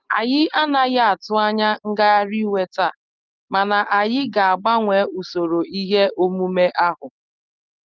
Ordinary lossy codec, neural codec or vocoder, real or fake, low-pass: Opus, 24 kbps; none; real; 7.2 kHz